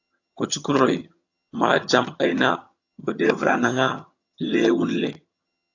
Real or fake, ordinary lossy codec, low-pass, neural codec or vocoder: fake; AAC, 48 kbps; 7.2 kHz; vocoder, 22.05 kHz, 80 mel bands, HiFi-GAN